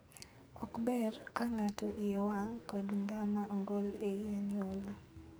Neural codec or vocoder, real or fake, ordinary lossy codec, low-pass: codec, 44.1 kHz, 2.6 kbps, SNAC; fake; none; none